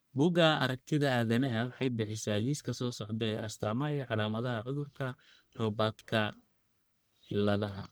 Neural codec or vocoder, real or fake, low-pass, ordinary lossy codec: codec, 44.1 kHz, 1.7 kbps, Pupu-Codec; fake; none; none